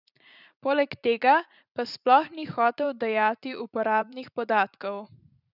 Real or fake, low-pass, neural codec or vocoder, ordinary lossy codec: fake; 5.4 kHz; vocoder, 44.1 kHz, 80 mel bands, Vocos; none